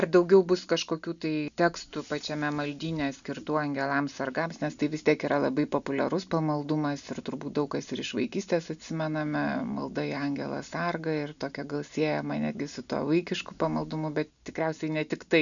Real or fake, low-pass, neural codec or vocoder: real; 7.2 kHz; none